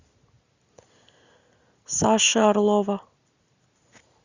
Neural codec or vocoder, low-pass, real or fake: none; 7.2 kHz; real